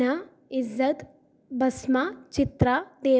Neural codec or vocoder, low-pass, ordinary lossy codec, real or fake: none; none; none; real